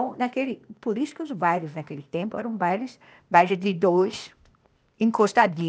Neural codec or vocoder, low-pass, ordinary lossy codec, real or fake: codec, 16 kHz, 0.8 kbps, ZipCodec; none; none; fake